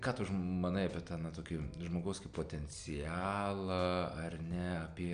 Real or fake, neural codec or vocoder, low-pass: real; none; 9.9 kHz